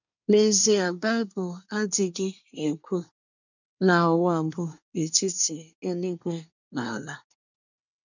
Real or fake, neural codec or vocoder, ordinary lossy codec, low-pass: fake; codec, 24 kHz, 1 kbps, SNAC; none; 7.2 kHz